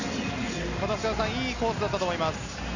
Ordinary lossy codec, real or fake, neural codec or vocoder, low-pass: none; real; none; 7.2 kHz